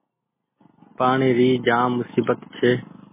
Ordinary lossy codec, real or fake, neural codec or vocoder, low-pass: MP3, 16 kbps; real; none; 3.6 kHz